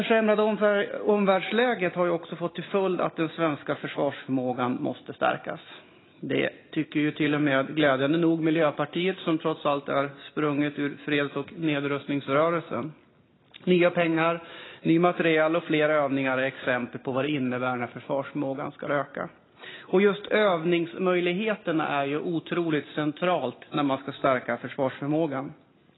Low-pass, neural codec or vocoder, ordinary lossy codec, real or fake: 7.2 kHz; none; AAC, 16 kbps; real